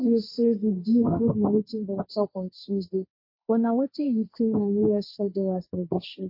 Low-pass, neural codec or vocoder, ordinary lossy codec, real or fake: 5.4 kHz; codec, 16 kHz, 1.1 kbps, Voila-Tokenizer; MP3, 32 kbps; fake